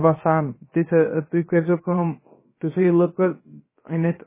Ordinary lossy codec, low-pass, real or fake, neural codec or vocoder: MP3, 16 kbps; 3.6 kHz; fake; codec, 16 kHz, about 1 kbps, DyCAST, with the encoder's durations